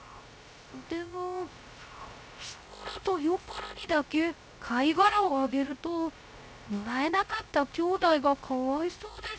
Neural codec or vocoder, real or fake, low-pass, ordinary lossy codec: codec, 16 kHz, 0.3 kbps, FocalCodec; fake; none; none